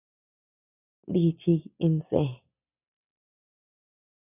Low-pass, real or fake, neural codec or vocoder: 3.6 kHz; real; none